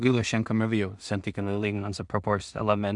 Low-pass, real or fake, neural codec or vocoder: 10.8 kHz; fake; codec, 16 kHz in and 24 kHz out, 0.4 kbps, LongCat-Audio-Codec, two codebook decoder